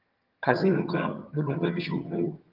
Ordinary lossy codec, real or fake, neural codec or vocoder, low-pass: Opus, 32 kbps; fake; vocoder, 22.05 kHz, 80 mel bands, HiFi-GAN; 5.4 kHz